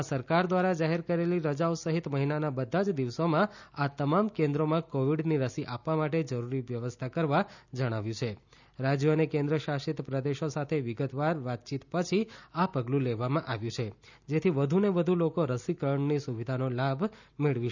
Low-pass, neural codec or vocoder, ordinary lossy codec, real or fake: 7.2 kHz; none; none; real